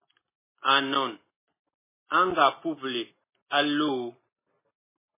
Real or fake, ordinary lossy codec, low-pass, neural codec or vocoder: real; MP3, 16 kbps; 3.6 kHz; none